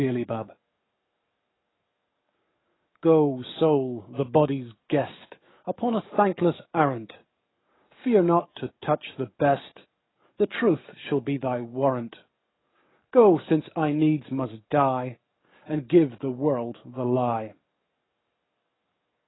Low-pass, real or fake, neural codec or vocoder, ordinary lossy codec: 7.2 kHz; fake; codec, 44.1 kHz, 7.8 kbps, DAC; AAC, 16 kbps